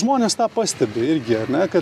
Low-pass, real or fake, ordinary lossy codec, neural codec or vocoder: 14.4 kHz; real; AAC, 96 kbps; none